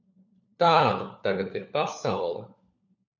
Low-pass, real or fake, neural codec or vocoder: 7.2 kHz; fake; codec, 16 kHz, 4 kbps, FunCodec, trained on LibriTTS, 50 frames a second